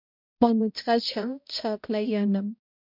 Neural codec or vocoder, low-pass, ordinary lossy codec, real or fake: codec, 16 kHz, 0.5 kbps, X-Codec, HuBERT features, trained on balanced general audio; 5.4 kHz; MP3, 48 kbps; fake